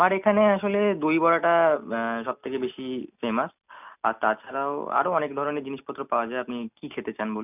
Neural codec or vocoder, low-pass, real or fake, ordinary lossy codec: none; 3.6 kHz; real; none